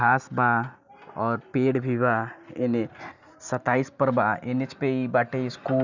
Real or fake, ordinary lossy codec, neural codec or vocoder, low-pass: real; none; none; 7.2 kHz